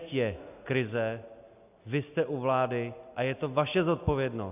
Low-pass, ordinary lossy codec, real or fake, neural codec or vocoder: 3.6 kHz; AAC, 32 kbps; real; none